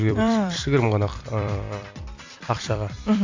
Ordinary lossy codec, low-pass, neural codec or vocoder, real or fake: AAC, 48 kbps; 7.2 kHz; vocoder, 44.1 kHz, 128 mel bands every 256 samples, BigVGAN v2; fake